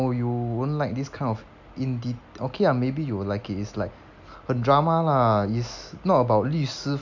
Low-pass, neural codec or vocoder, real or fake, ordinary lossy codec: 7.2 kHz; none; real; none